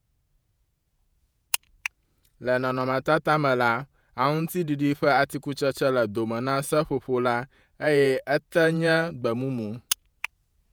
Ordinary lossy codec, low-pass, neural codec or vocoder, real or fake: none; none; vocoder, 48 kHz, 128 mel bands, Vocos; fake